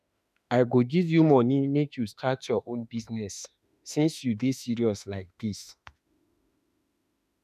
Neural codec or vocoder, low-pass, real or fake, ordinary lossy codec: autoencoder, 48 kHz, 32 numbers a frame, DAC-VAE, trained on Japanese speech; 14.4 kHz; fake; none